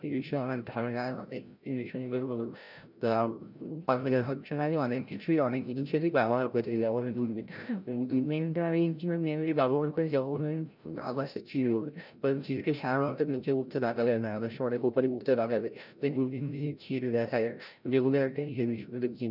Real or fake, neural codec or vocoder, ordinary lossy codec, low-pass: fake; codec, 16 kHz, 0.5 kbps, FreqCodec, larger model; none; 5.4 kHz